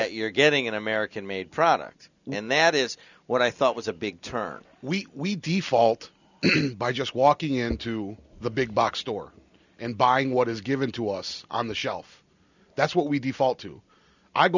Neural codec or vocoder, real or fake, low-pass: none; real; 7.2 kHz